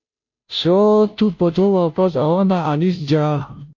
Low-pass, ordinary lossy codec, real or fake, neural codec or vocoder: 7.2 kHz; MP3, 48 kbps; fake; codec, 16 kHz, 0.5 kbps, FunCodec, trained on Chinese and English, 25 frames a second